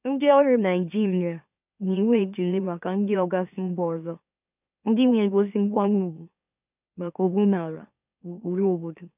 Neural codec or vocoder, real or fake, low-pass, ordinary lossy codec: autoencoder, 44.1 kHz, a latent of 192 numbers a frame, MeloTTS; fake; 3.6 kHz; none